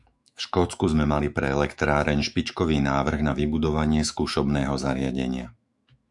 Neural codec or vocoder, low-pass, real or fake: autoencoder, 48 kHz, 128 numbers a frame, DAC-VAE, trained on Japanese speech; 10.8 kHz; fake